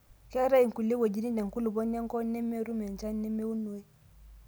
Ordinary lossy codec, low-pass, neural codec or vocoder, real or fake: none; none; none; real